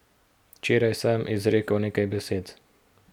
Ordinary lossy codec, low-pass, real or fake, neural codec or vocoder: none; 19.8 kHz; real; none